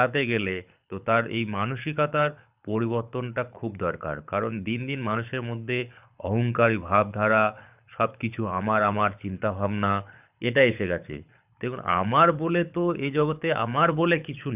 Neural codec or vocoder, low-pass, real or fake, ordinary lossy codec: codec, 24 kHz, 6 kbps, HILCodec; 3.6 kHz; fake; none